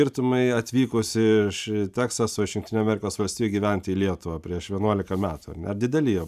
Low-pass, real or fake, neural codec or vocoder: 14.4 kHz; fake; vocoder, 44.1 kHz, 128 mel bands every 512 samples, BigVGAN v2